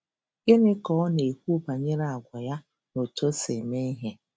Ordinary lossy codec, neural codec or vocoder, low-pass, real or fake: none; none; none; real